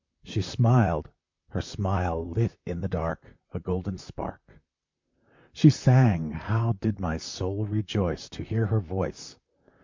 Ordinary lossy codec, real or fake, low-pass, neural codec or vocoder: MP3, 64 kbps; fake; 7.2 kHz; vocoder, 44.1 kHz, 128 mel bands, Pupu-Vocoder